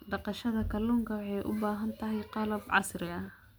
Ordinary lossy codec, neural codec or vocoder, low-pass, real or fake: none; none; none; real